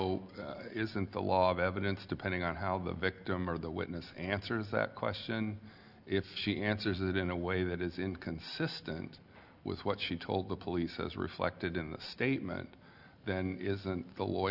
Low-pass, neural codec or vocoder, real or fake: 5.4 kHz; none; real